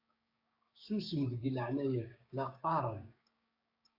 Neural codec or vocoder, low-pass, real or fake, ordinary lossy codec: codec, 16 kHz, 6 kbps, DAC; 5.4 kHz; fake; AAC, 48 kbps